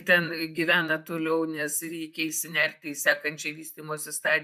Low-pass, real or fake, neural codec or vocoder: 14.4 kHz; fake; vocoder, 44.1 kHz, 128 mel bands, Pupu-Vocoder